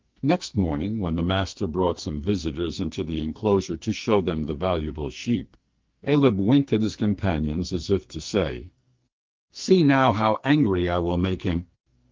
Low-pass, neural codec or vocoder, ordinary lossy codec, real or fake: 7.2 kHz; codec, 44.1 kHz, 2.6 kbps, SNAC; Opus, 24 kbps; fake